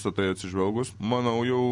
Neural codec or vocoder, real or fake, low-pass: none; real; 10.8 kHz